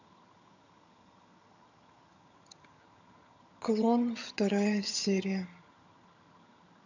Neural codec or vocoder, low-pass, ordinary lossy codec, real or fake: vocoder, 22.05 kHz, 80 mel bands, HiFi-GAN; 7.2 kHz; none; fake